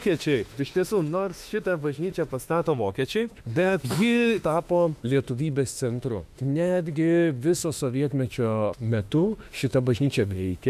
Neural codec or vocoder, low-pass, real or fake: autoencoder, 48 kHz, 32 numbers a frame, DAC-VAE, trained on Japanese speech; 14.4 kHz; fake